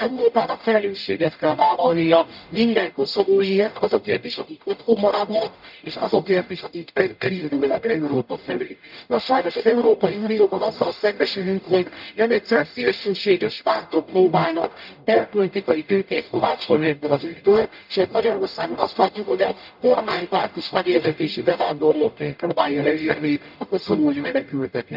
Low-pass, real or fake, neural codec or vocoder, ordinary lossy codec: 5.4 kHz; fake; codec, 44.1 kHz, 0.9 kbps, DAC; none